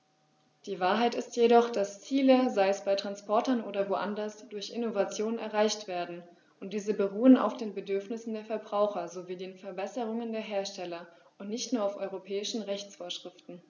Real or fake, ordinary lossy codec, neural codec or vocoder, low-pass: real; none; none; 7.2 kHz